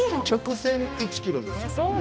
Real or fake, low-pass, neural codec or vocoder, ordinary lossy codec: fake; none; codec, 16 kHz, 1 kbps, X-Codec, HuBERT features, trained on balanced general audio; none